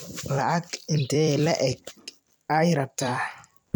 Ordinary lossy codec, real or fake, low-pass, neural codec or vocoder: none; fake; none; vocoder, 44.1 kHz, 128 mel bands every 256 samples, BigVGAN v2